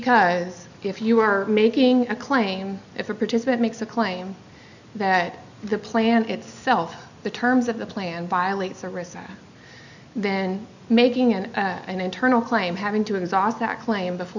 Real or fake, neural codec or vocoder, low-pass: real; none; 7.2 kHz